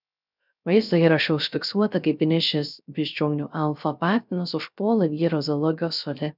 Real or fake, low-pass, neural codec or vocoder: fake; 5.4 kHz; codec, 16 kHz, 0.3 kbps, FocalCodec